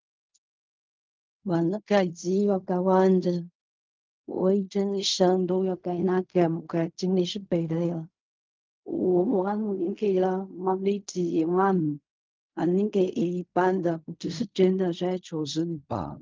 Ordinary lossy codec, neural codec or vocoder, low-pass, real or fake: Opus, 24 kbps; codec, 16 kHz in and 24 kHz out, 0.4 kbps, LongCat-Audio-Codec, fine tuned four codebook decoder; 7.2 kHz; fake